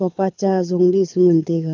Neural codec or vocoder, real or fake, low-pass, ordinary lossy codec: codec, 24 kHz, 6 kbps, HILCodec; fake; 7.2 kHz; none